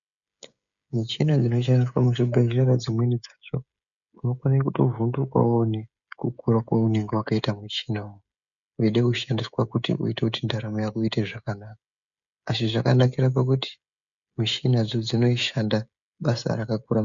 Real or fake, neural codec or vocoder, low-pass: fake; codec, 16 kHz, 16 kbps, FreqCodec, smaller model; 7.2 kHz